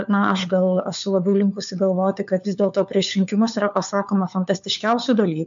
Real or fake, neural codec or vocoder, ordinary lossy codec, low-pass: fake; codec, 16 kHz, 4 kbps, FunCodec, trained on LibriTTS, 50 frames a second; MP3, 64 kbps; 7.2 kHz